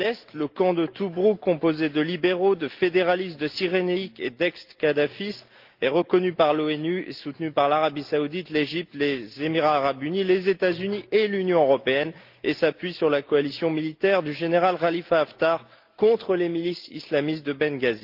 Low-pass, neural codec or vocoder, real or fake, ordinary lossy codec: 5.4 kHz; none; real; Opus, 32 kbps